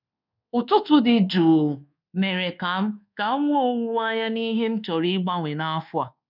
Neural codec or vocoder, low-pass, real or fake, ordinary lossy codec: codec, 24 kHz, 1.2 kbps, DualCodec; 5.4 kHz; fake; none